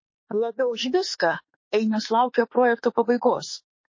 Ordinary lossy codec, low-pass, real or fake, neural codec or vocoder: MP3, 32 kbps; 7.2 kHz; fake; autoencoder, 48 kHz, 32 numbers a frame, DAC-VAE, trained on Japanese speech